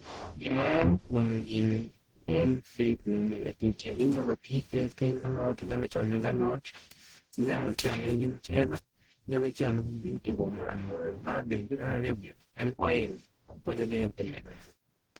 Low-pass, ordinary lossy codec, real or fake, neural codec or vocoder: 19.8 kHz; Opus, 16 kbps; fake; codec, 44.1 kHz, 0.9 kbps, DAC